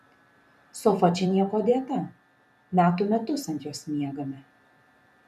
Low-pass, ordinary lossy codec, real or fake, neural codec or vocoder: 14.4 kHz; MP3, 96 kbps; fake; vocoder, 44.1 kHz, 128 mel bands every 512 samples, BigVGAN v2